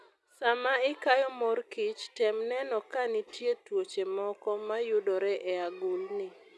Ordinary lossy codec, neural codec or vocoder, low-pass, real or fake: none; none; none; real